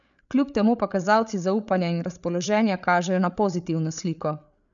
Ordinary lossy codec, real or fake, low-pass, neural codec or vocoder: none; fake; 7.2 kHz; codec, 16 kHz, 8 kbps, FreqCodec, larger model